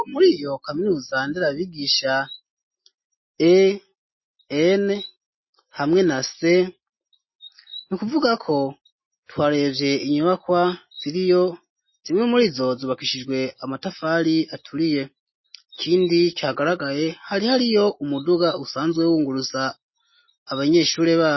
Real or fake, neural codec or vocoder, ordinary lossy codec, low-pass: real; none; MP3, 24 kbps; 7.2 kHz